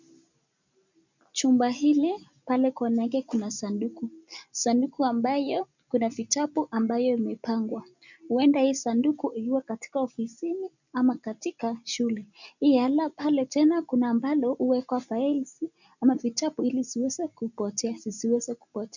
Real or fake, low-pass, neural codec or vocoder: real; 7.2 kHz; none